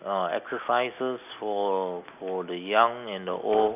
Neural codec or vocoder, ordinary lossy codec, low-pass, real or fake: none; none; 3.6 kHz; real